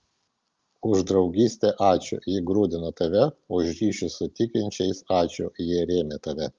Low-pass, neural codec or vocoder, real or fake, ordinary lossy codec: 7.2 kHz; none; real; Opus, 32 kbps